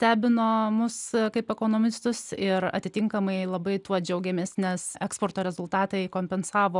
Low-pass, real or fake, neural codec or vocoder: 10.8 kHz; real; none